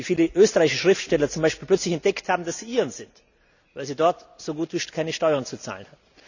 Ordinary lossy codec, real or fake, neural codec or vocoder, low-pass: none; real; none; 7.2 kHz